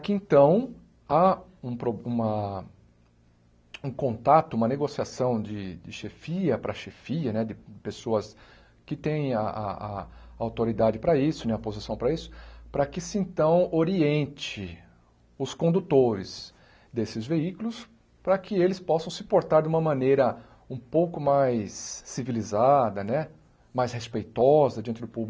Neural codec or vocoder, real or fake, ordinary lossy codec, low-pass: none; real; none; none